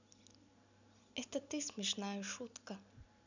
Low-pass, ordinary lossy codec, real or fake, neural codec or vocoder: 7.2 kHz; none; real; none